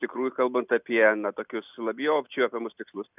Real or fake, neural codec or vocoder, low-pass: real; none; 3.6 kHz